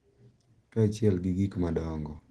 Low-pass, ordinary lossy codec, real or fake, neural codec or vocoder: 10.8 kHz; Opus, 16 kbps; real; none